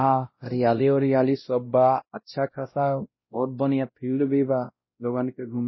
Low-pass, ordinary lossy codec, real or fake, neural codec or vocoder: 7.2 kHz; MP3, 24 kbps; fake; codec, 16 kHz, 0.5 kbps, X-Codec, WavLM features, trained on Multilingual LibriSpeech